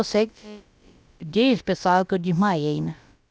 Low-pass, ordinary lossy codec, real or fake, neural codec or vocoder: none; none; fake; codec, 16 kHz, about 1 kbps, DyCAST, with the encoder's durations